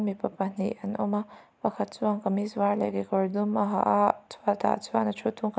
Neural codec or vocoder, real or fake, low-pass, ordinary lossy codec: none; real; none; none